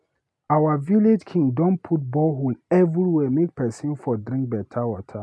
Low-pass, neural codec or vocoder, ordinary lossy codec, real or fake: none; none; none; real